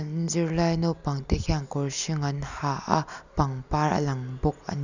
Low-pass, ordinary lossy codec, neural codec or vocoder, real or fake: 7.2 kHz; none; none; real